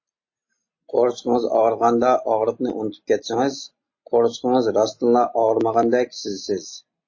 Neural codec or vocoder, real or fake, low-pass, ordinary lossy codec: none; real; 7.2 kHz; MP3, 32 kbps